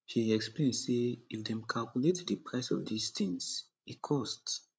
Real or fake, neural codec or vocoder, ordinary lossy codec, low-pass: fake; codec, 16 kHz, 16 kbps, FreqCodec, larger model; none; none